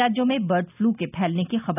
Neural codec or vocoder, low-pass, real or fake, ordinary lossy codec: none; 3.6 kHz; real; none